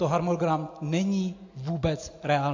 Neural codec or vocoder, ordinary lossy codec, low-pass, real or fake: none; AAC, 48 kbps; 7.2 kHz; real